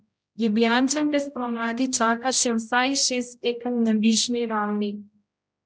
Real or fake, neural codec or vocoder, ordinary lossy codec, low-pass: fake; codec, 16 kHz, 0.5 kbps, X-Codec, HuBERT features, trained on general audio; none; none